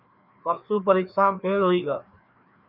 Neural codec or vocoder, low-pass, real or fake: codec, 16 kHz, 2 kbps, FreqCodec, larger model; 5.4 kHz; fake